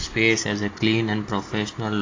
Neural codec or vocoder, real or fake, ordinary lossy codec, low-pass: none; real; AAC, 32 kbps; 7.2 kHz